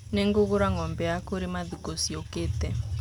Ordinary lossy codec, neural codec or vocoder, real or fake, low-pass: none; none; real; 19.8 kHz